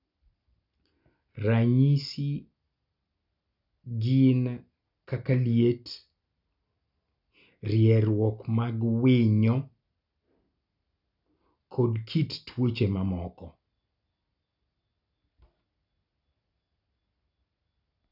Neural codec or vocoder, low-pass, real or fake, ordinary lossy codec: none; 5.4 kHz; real; none